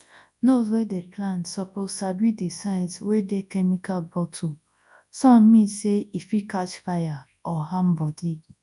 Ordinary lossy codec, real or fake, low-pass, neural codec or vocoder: none; fake; 10.8 kHz; codec, 24 kHz, 0.9 kbps, WavTokenizer, large speech release